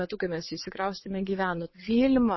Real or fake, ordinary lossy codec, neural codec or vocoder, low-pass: fake; MP3, 24 kbps; vocoder, 22.05 kHz, 80 mel bands, WaveNeXt; 7.2 kHz